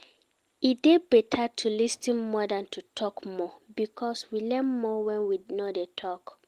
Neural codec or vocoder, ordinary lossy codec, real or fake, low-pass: none; Opus, 24 kbps; real; 14.4 kHz